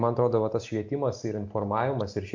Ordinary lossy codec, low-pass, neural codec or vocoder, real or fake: MP3, 48 kbps; 7.2 kHz; none; real